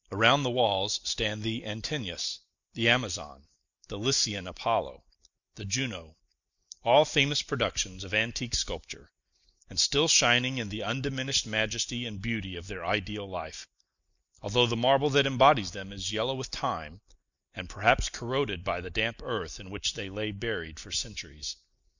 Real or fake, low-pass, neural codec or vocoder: real; 7.2 kHz; none